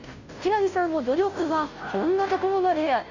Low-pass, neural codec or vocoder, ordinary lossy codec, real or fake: 7.2 kHz; codec, 16 kHz, 0.5 kbps, FunCodec, trained on Chinese and English, 25 frames a second; none; fake